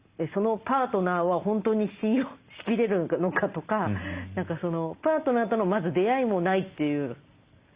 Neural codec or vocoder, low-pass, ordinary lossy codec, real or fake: none; 3.6 kHz; Opus, 64 kbps; real